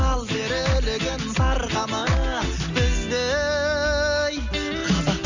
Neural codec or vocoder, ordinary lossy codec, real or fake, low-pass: none; none; real; 7.2 kHz